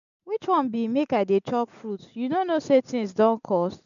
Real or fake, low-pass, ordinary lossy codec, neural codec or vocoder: real; 7.2 kHz; none; none